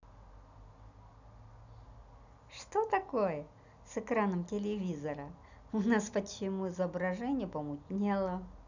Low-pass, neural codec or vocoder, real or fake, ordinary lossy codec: 7.2 kHz; none; real; none